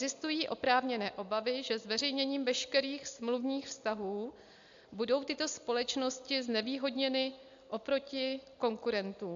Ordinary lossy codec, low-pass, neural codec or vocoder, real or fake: AAC, 64 kbps; 7.2 kHz; none; real